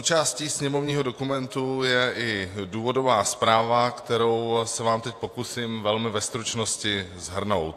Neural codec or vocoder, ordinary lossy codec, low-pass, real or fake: vocoder, 48 kHz, 128 mel bands, Vocos; AAC, 64 kbps; 14.4 kHz; fake